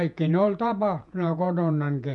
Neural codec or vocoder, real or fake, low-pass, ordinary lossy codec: vocoder, 48 kHz, 128 mel bands, Vocos; fake; 10.8 kHz; none